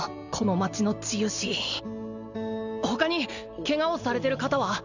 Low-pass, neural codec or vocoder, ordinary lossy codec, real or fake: 7.2 kHz; none; none; real